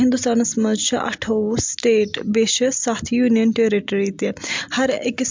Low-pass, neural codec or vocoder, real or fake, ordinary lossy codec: 7.2 kHz; none; real; MP3, 64 kbps